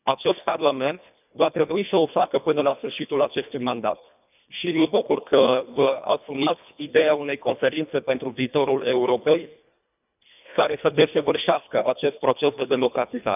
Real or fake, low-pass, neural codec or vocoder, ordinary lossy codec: fake; 3.6 kHz; codec, 24 kHz, 1.5 kbps, HILCodec; none